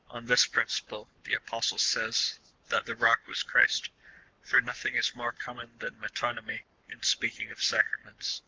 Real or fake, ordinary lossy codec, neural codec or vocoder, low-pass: fake; Opus, 24 kbps; codec, 44.1 kHz, 7.8 kbps, Pupu-Codec; 7.2 kHz